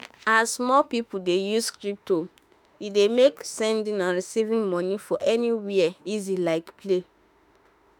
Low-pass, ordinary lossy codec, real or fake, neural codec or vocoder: none; none; fake; autoencoder, 48 kHz, 32 numbers a frame, DAC-VAE, trained on Japanese speech